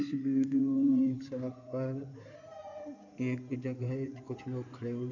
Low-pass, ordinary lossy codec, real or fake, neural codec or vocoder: 7.2 kHz; none; fake; codec, 16 kHz in and 24 kHz out, 2.2 kbps, FireRedTTS-2 codec